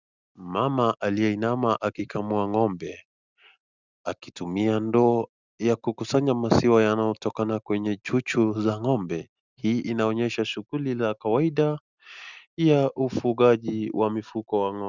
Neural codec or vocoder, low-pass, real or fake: none; 7.2 kHz; real